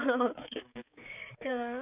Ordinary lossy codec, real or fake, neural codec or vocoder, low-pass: none; fake; codec, 16 kHz, 4 kbps, X-Codec, HuBERT features, trained on balanced general audio; 3.6 kHz